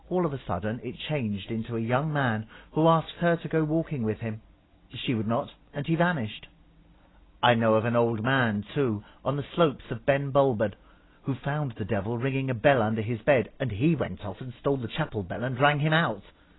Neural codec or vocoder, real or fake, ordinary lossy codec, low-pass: none; real; AAC, 16 kbps; 7.2 kHz